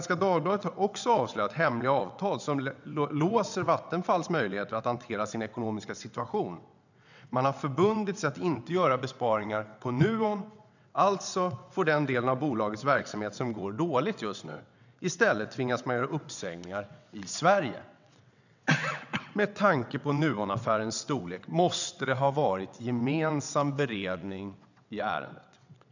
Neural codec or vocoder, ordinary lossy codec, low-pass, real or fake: vocoder, 22.05 kHz, 80 mel bands, WaveNeXt; none; 7.2 kHz; fake